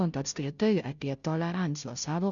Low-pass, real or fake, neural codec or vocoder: 7.2 kHz; fake; codec, 16 kHz, 0.5 kbps, FunCodec, trained on Chinese and English, 25 frames a second